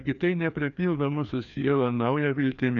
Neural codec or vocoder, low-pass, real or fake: codec, 16 kHz, 2 kbps, FreqCodec, larger model; 7.2 kHz; fake